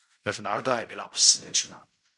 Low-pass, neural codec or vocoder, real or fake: 10.8 kHz; codec, 16 kHz in and 24 kHz out, 0.4 kbps, LongCat-Audio-Codec, fine tuned four codebook decoder; fake